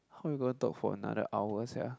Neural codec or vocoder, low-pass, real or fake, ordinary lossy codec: none; none; real; none